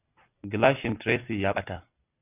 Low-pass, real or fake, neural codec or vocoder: 3.6 kHz; real; none